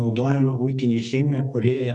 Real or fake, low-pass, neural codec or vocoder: fake; 10.8 kHz; codec, 24 kHz, 0.9 kbps, WavTokenizer, medium music audio release